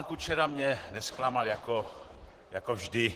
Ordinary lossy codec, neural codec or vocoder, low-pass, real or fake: Opus, 16 kbps; vocoder, 44.1 kHz, 128 mel bands, Pupu-Vocoder; 14.4 kHz; fake